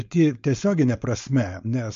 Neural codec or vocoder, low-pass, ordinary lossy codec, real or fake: codec, 16 kHz, 4.8 kbps, FACodec; 7.2 kHz; MP3, 48 kbps; fake